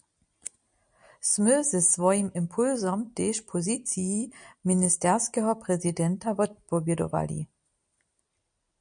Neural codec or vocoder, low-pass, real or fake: none; 9.9 kHz; real